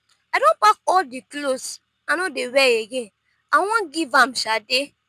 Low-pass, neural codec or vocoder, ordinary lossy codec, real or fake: 14.4 kHz; none; none; real